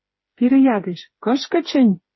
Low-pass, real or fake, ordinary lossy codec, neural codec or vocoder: 7.2 kHz; fake; MP3, 24 kbps; codec, 16 kHz, 4 kbps, FreqCodec, smaller model